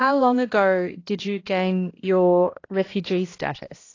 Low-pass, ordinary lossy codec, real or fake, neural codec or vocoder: 7.2 kHz; AAC, 32 kbps; fake; codec, 16 kHz, 1 kbps, X-Codec, HuBERT features, trained on balanced general audio